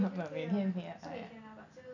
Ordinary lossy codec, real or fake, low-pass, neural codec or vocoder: none; real; 7.2 kHz; none